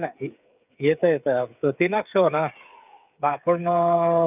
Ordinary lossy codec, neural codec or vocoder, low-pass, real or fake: none; codec, 16 kHz, 8 kbps, FreqCodec, smaller model; 3.6 kHz; fake